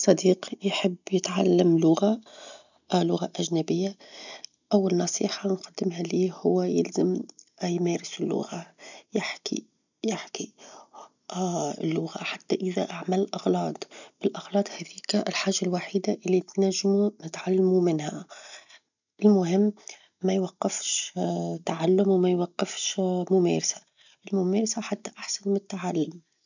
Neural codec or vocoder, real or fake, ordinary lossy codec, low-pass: none; real; none; 7.2 kHz